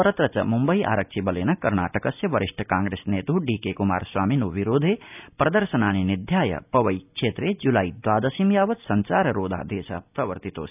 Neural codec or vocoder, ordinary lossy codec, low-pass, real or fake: none; none; 3.6 kHz; real